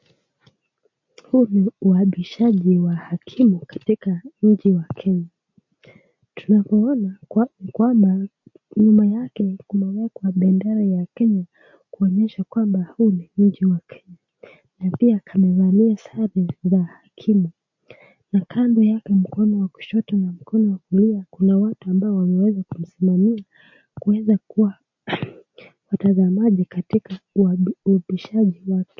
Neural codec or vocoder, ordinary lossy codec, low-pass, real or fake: none; MP3, 48 kbps; 7.2 kHz; real